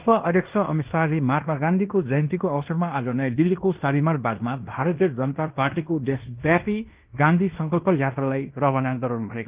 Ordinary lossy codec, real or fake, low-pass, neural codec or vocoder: Opus, 32 kbps; fake; 3.6 kHz; codec, 16 kHz in and 24 kHz out, 0.9 kbps, LongCat-Audio-Codec, fine tuned four codebook decoder